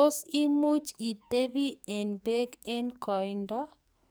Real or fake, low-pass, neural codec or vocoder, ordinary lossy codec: fake; none; codec, 44.1 kHz, 2.6 kbps, SNAC; none